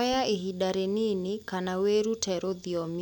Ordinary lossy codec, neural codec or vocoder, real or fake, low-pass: none; none; real; 19.8 kHz